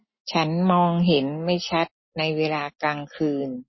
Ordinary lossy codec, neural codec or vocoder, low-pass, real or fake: MP3, 24 kbps; none; 7.2 kHz; real